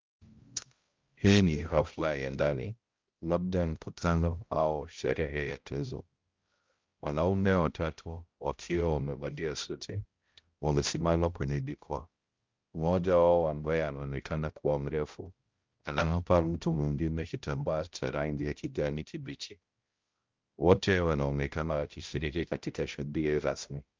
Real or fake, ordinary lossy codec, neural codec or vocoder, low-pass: fake; Opus, 24 kbps; codec, 16 kHz, 0.5 kbps, X-Codec, HuBERT features, trained on balanced general audio; 7.2 kHz